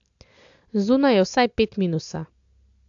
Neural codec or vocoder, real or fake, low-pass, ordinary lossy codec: none; real; 7.2 kHz; AAC, 64 kbps